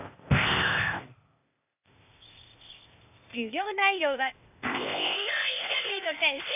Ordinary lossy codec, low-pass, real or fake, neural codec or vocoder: AAC, 32 kbps; 3.6 kHz; fake; codec, 16 kHz, 0.8 kbps, ZipCodec